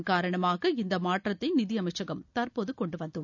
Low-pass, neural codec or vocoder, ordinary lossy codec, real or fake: 7.2 kHz; none; none; real